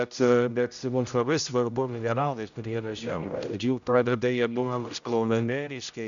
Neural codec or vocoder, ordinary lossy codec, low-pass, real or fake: codec, 16 kHz, 0.5 kbps, X-Codec, HuBERT features, trained on general audio; MP3, 96 kbps; 7.2 kHz; fake